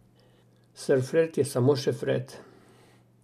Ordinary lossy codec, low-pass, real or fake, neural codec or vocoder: none; 14.4 kHz; real; none